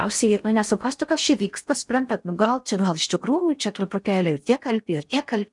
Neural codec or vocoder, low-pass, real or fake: codec, 16 kHz in and 24 kHz out, 0.6 kbps, FocalCodec, streaming, 4096 codes; 10.8 kHz; fake